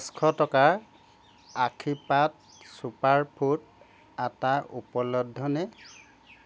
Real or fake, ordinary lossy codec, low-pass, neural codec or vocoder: real; none; none; none